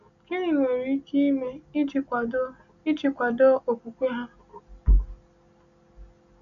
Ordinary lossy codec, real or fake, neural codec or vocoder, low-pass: none; real; none; 7.2 kHz